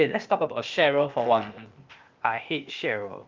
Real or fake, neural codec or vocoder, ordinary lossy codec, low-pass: fake; codec, 16 kHz, 0.8 kbps, ZipCodec; Opus, 24 kbps; 7.2 kHz